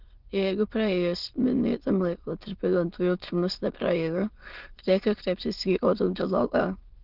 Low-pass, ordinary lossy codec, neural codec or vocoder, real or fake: 5.4 kHz; Opus, 16 kbps; autoencoder, 22.05 kHz, a latent of 192 numbers a frame, VITS, trained on many speakers; fake